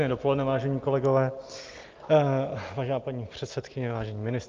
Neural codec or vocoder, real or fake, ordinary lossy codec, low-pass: none; real; Opus, 32 kbps; 7.2 kHz